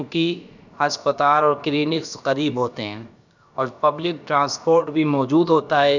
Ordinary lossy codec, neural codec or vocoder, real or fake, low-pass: none; codec, 16 kHz, about 1 kbps, DyCAST, with the encoder's durations; fake; 7.2 kHz